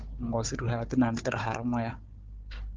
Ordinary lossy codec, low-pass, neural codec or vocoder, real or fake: Opus, 32 kbps; 7.2 kHz; codec, 16 kHz, 4 kbps, FunCodec, trained on Chinese and English, 50 frames a second; fake